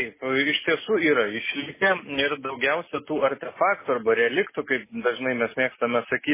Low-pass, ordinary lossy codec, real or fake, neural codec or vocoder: 3.6 kHz; MP3, 16 kbps; real; none